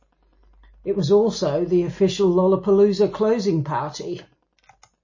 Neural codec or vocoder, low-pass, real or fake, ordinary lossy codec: none; 7.2 kHz; real; MP3, 32 kbps